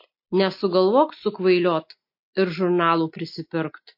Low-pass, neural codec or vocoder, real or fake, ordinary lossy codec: 5.4 kHz; none; real; MP3, 32 kbps